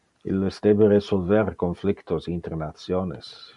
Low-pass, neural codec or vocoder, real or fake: 10.8 kHz; none; real